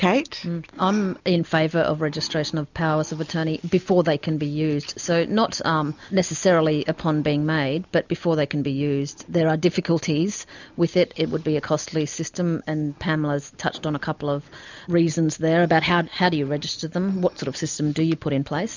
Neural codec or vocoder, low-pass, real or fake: none; 7.2 kHz; real